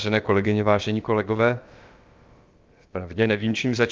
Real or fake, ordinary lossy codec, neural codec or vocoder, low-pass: fake; Opus, 24 kbps; codec, 16 kHz, about 1 kbps, DyCAST, with the encoder's durations; 7.2 kHz